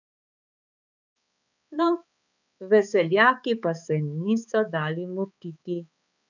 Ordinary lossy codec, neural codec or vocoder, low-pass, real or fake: none; codec, 16 kHz, 4 kbps, X-Codec, HuBERT features, trained on balanced general audio; 7.2 kHz; fake